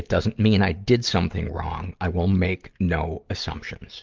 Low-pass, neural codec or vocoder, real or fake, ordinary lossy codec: 7.2 kHz; none; real; Opus, 32 kbps